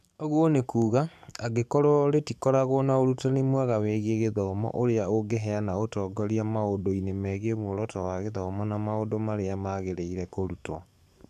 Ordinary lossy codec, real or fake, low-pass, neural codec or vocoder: none; fake; 14.4 kHz; codec, 44.1 kHz, 7.8 kbps, DAC